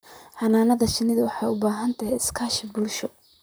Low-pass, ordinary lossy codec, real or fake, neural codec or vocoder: none; none; fake; vocoder, 44.1 kHz, 128 mel bands, Pupu-Vocoder